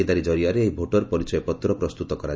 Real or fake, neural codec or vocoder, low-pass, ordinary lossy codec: real; none; none; none